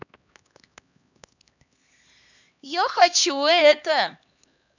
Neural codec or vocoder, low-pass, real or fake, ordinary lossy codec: codec, 16 kHz, 2 kbps, X-Codec, HuBERT features, trained on LibriSpeech; 7.2 kHz; fake; none